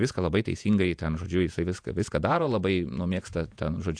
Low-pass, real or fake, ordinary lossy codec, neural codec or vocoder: 9.9 kHz; fake; AAC, 64 kbps; vocoder, 48 kHz, 128 mel bands, Vocos